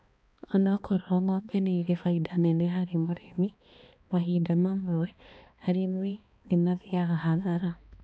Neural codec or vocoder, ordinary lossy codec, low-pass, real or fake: codec, 16 kHz, 2 kbps, X-Codec, HuBERT features, trained on balanced general audio; none; none; fake